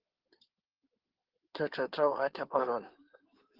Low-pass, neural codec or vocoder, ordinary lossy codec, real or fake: 5.4 kHz; codec, 16 kHz in and 24 kHz out, 2.2 kbps, FireRedTTS-2 codec; Opus, 16 kbps; fake